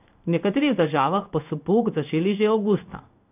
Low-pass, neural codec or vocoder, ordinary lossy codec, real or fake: 3.6 kHz; codec, 16 kHz in and 24 kHz out, 1 kbps, XY-Tokenizer; none; fake